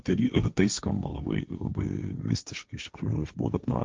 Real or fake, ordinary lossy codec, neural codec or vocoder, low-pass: fake; Opus, 24 kbps; codec, 16 kHz, 1.1 kbps, Voila-Tokenizer; 7.2 kHz